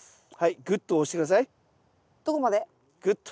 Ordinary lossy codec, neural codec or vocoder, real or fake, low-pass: none; none; real; none